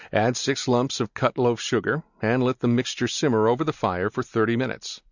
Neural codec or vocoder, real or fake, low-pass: none; real; 7.2 kHz